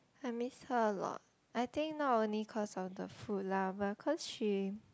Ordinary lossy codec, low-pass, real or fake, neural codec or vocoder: none; none; real; none